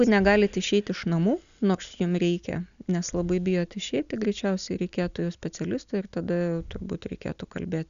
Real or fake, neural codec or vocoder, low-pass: real; none; 7.2 kHz